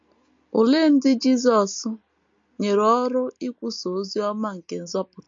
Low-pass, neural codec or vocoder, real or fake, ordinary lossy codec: 7.2 kHz; none; real; MP3, 48 kbps